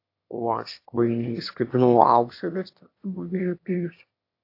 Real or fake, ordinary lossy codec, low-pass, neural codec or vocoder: fake; AAC, 32 kbps; 5.4 kHz; autoencoder, 22.05 kHz, a latent of 192 numbers a frame, VITS, trained on one speaker